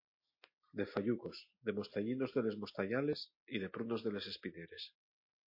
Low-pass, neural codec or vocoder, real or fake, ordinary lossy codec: 5.4 kHz; none; real; MP3, 32 kbps